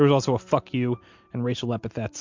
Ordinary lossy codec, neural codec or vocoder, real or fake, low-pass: MP3, 64 kbps; none; real; 7.2 kHz